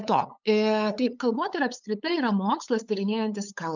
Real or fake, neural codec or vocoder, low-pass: fake; codec, 16 kHz, 8 kbps, FunCodec, trained on LibriTTS, 25 frames a second; 7.2 kHz